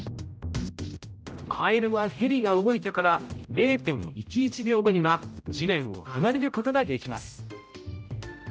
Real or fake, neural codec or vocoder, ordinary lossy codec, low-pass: fake; codec, 16 kHz, 0.5 kbps, X-Codec, HuBERT features, trained on general audio; none; none